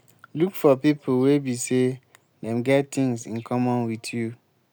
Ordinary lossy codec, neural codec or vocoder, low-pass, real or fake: none; none; none; real